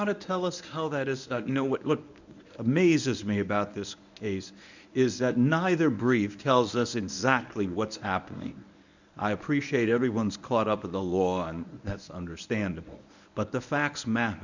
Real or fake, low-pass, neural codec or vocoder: fake; 7.2 kHz; codec, 24 kHz, 0.9 kbps, WavTokenizer, medium speech release version 1